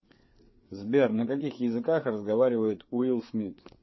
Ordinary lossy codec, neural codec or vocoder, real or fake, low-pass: MP3, 24 kbps; codec, 16 kHz, 4 kbps, FreqCodec, larger model; fake; 7.2 kHz